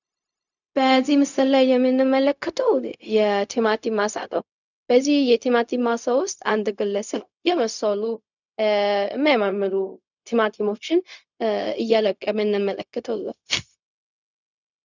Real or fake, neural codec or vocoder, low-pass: fake; codec, 16 kHz, 0.4 kbps, LongCat-Audio-Codec; 7.2 kHz